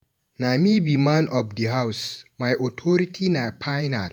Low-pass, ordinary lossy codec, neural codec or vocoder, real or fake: none; none; vocoder, 48 kHz, 128 mel bands, Vocos; fake